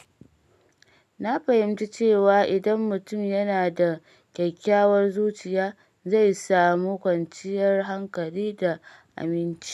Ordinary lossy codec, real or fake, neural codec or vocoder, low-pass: none; real; none; 14.4 kHz